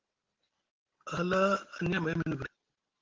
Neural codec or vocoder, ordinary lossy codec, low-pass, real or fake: none; Opus, 16 kbps; 7.2 kHz; real